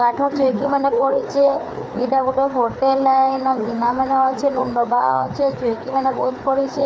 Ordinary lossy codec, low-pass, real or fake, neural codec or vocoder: none; none; fake; codec, 16 kHz, 4 kbps, FreqCodec, larger model